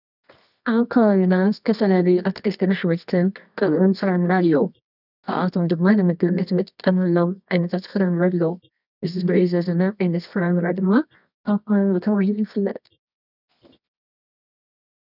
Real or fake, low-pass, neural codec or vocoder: fake; 5.4 kHz; codec, 24 kHz, 0.9 kbps, WavTokenizer, medium music audio release